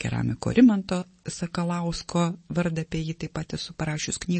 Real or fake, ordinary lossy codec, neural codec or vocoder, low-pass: real; MP3, 32 kbps; none; 10.8 kHz